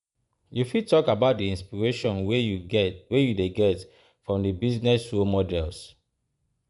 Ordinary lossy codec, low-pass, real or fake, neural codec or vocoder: none; 10.8 kHz; fake; vocoder, 24 kHz, 100 mel bands, Vocos